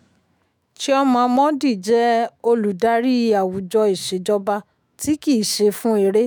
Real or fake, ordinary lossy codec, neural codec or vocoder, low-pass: fake; none; autoencoder, 48 kHz, 128 numbers a frame, DAC-VAE, trained on Japanese speech; none